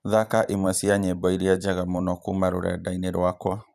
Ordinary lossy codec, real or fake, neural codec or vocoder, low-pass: none; fake; vocoder, 44.1 kHz, 128 mel bands every 512 samples, BigVGAN v2; 14.4 kHz